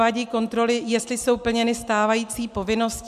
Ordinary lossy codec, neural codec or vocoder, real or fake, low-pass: MP3, 96 kbps; none; real; 14.4 kHz